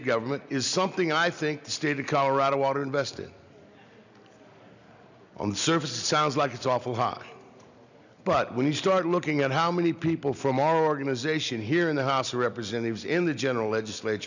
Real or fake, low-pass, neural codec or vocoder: real; 7.2 kHz; none